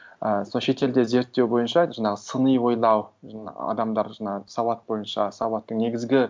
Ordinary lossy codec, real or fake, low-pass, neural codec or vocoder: none; real; none; none